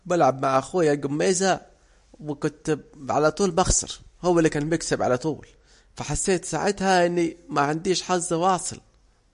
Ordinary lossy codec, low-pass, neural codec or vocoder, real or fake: MP3, 48 kbps; 10.8 kHz; none; real